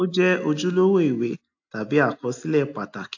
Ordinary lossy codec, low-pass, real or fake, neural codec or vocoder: AAC, 48 kbps; 7.2 kHz; real; none